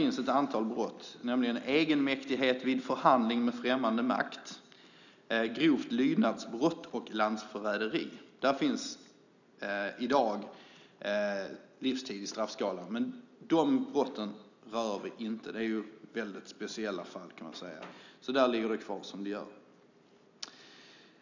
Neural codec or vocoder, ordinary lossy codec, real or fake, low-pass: none; none; real; 7.2 kHz